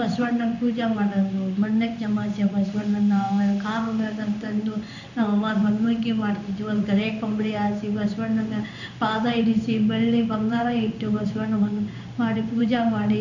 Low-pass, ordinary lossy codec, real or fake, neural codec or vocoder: 7.2 kHz; none; fake; codec, 16 kHz in and 24 kHz out, 1 kbps, XY-Tokenizer